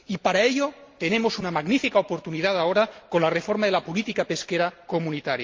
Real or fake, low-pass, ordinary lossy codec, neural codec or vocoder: real; 7.2 kHz; Opus, 32 kbps; none